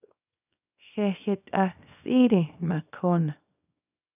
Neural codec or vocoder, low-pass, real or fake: codec, 24 kHz, 0.9 kbps, WavTokenizer, small release; 3.6 kHz; fake